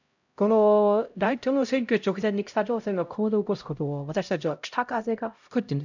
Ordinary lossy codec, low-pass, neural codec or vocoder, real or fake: none; 7.2 kHz; codec, 16 kHz, 0.5 kbps, X-Codec, HuBERT features, trained on LibriSpeech; fake